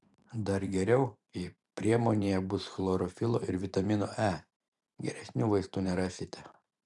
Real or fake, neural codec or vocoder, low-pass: fake; vocoder, 44.1 kHz, 128 mel bands every 512 samples, BigVGAN v2; 10.8 kHz